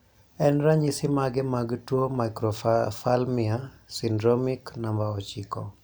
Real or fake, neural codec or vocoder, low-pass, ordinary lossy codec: real; none; none; none